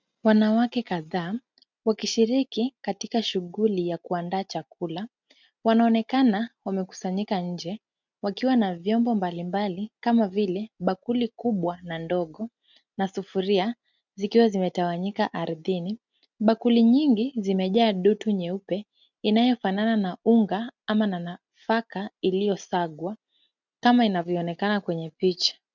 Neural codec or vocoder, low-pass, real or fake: none; 7.2 kHz; real